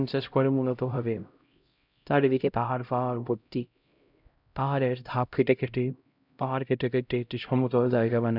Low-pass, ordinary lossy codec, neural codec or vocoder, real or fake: 5.4 kHz; none; codec, 16 kHz, 0.5 kbps, X-Codec, HuBERT features, trained on LibriSpeech; fake